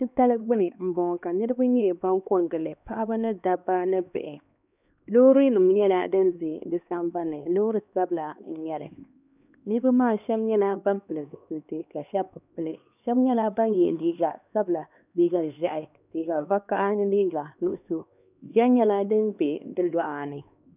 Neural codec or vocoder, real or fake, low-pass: codec, 16 kHz, 2 kbps, X-Codec, HuBERT features, trained on LibriSpeech; fake; 3.6 kHz